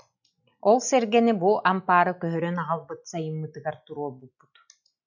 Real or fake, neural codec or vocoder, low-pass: real; none; 7.2 kHz